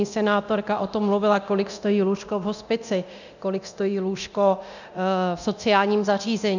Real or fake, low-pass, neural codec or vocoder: fake; 7.2 kHz; codec, 24 kHz, 0.9 kbps, DualCodec